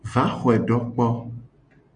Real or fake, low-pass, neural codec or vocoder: real; 9.9 kHz; none